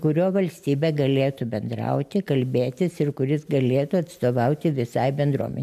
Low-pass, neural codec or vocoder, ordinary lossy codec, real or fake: 14.4 kHz; none; MP3, 96 kbps; real